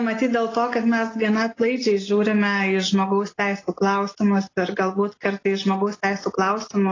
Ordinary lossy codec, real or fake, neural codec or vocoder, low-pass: AAC, 32 kbps; real; none; 7.2 kHz